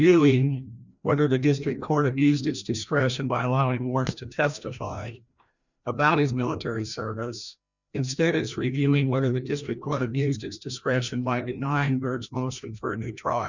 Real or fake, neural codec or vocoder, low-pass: fake; codec, 16 kHz, 1 kbps, FreqCodec, larger model; 7.2 kHz